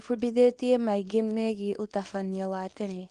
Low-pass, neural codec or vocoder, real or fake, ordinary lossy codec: 10.8 kHz; codec, 24 kHz, 0.9 kbps, WavTokenizer, small release; fake; Opus, 24 kbps